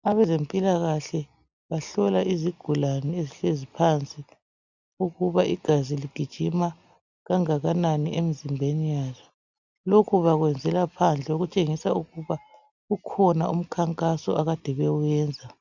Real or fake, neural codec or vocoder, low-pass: real; none; 7.2 kHz